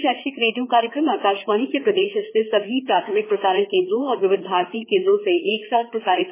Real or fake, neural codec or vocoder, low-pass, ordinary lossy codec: fake; codec, 16 kHz, 4 kbps, FreqCodec, larger model; 3.6 kHz; MP3, 16 kbps